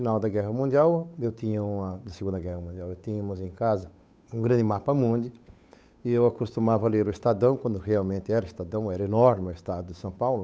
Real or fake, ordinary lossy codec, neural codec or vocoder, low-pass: fake; none; codec, 16 kHz, 8 kbps, FunCodec, trained on Chinese and English, 25 frames a second; none